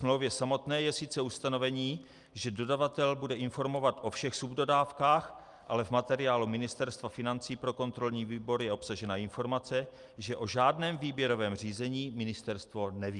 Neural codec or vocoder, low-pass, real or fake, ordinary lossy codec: none; 10.8 kHz; real; Opus, 32 kbps